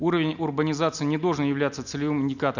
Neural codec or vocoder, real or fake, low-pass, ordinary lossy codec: none; real; 7.2 kHz; none